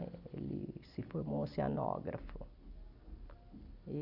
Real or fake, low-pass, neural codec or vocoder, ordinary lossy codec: real; 5.4 kHz; none; Opus, 64 kbps